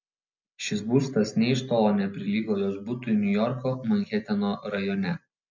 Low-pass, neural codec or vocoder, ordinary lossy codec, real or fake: 7.2 kHz; none; MP3, 48 kbps; real